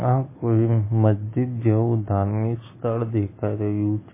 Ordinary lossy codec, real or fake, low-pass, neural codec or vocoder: MP3, 16 kbps; real; 3.6 kHz; none